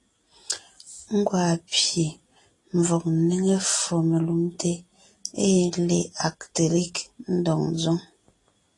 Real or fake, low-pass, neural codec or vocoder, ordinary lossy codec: real; 10.8 kHz; none; AAC, 32 kbps